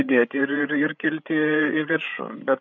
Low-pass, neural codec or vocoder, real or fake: 7.2 kHz; codec, 16 kHz, 8 kbps, FreqCodec, larger model; fake